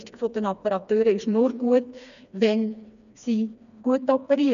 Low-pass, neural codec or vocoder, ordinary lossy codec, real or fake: 7.2 kHz; codec, 16 kHz, 2 kbps, FreqCodec, smaller model; none; fake